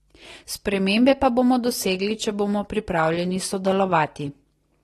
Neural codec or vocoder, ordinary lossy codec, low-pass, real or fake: none; AAC, 32 kbps; 19.8 kHz; real